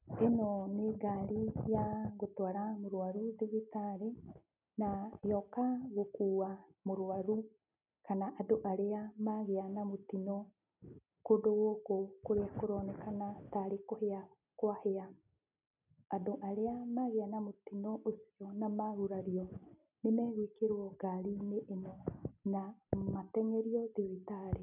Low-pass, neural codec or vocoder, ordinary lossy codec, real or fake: 3.6 kHz; none; none; real